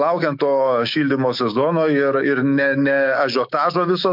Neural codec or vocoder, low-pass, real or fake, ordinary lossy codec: autoencoder, 48 kHz, 128 numbers a frame, DAC-VAE, trained on Japanese speech; 5.4 kHz; fake; MP3, 48 kbps